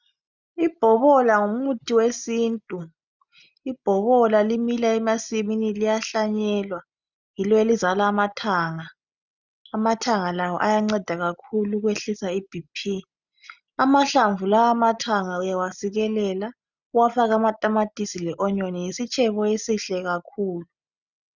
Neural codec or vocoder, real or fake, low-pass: none; real; 7.2 kHz